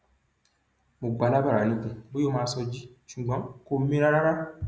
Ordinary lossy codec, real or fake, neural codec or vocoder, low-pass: none; real; none; none